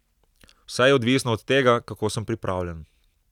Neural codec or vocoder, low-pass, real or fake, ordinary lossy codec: none; 19.8 kHz; real; none